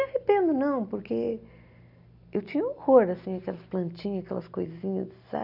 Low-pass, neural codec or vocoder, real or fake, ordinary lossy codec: 5.4 kHz; none; real; none